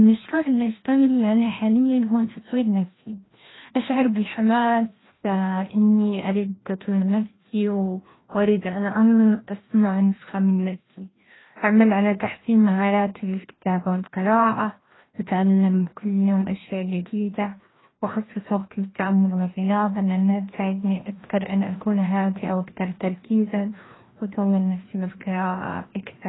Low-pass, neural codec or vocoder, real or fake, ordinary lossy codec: 7.2 kHz; codec, 16 kHz, 1 kbps, FreqCodec, larger model; fake; AAC, 16 kbps